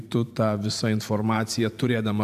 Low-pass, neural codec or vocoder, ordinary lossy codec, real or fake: 14.4 kHz; vocoder, 44.1 kHz, 128 mel bands every 512 samples, BigVGAN v2; AAC, 96 kbps; fake